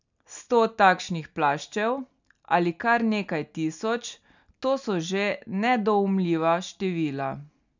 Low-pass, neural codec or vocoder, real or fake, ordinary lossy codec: 7.2 kHz; none; real; none